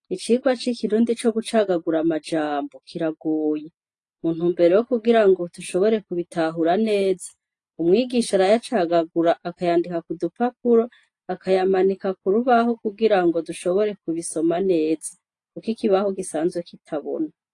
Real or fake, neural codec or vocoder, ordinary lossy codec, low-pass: real; none; AAC, 48 kbps; 10.8 kHz